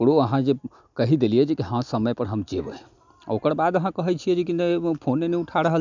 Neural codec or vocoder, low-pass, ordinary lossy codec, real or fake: none; 7.2 kHz; none; real